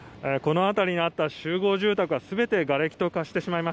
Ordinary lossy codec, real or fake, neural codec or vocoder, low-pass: none; real; none; none